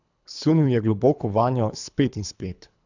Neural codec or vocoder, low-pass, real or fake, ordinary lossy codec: codec, 24 kHz, 3 kbps, HILCodec; 7.2 kHz; fake; none